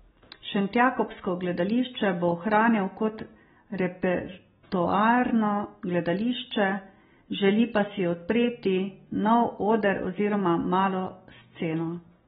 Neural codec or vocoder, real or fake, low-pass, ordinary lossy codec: none; real; 7.2 kHz; AAC, 16 kbps